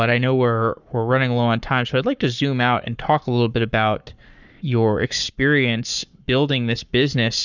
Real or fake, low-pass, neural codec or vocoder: fake; 7.2 kHz; codec, 44.1 kHz, 7.8 kbps, Pupu-Codec